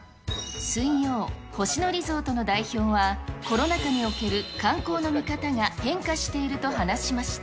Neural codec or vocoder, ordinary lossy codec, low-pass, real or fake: none; none; none; real